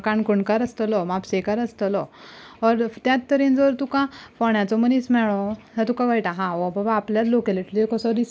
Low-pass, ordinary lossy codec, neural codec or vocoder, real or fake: none; none; none; real